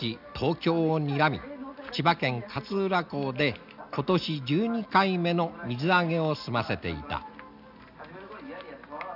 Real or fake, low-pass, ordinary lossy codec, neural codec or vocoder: real; 5.4 kHz; none; none